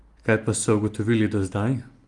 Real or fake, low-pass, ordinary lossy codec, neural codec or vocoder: real; 10.8 kHz; Opus, 32 kbps; none